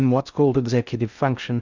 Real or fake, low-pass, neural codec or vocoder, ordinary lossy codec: fake; 7.2 kHz; codec, 16 kHz in and 24 kHz out, 0.6 kbps, FocalCodec, streaming, 2048 codes; Opus, 64 kbps